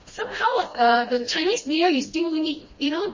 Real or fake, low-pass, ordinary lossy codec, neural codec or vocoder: fake; 7.2 kHz; MP3, 32 kbps; codec, 16 kHz, 1 kbps, FreqCodec, smaller model